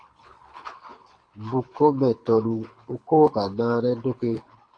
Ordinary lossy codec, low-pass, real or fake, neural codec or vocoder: AAC, 48 kbps; 9.9 kHz; fake; codec, 24 kHz, 6 kbps, HILCodec